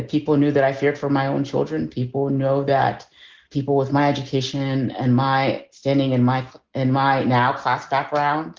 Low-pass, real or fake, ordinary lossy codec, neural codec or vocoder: 7.2 kHz; real; Opus, 16 kbps; none